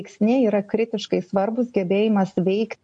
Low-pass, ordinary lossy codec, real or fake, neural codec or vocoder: 9.9 kHz; MP3, 48 kbps; real; none